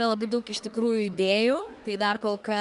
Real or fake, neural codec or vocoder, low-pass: fake; codec, 24 kHz, 1 kbps, SNAC; 10.8 kHz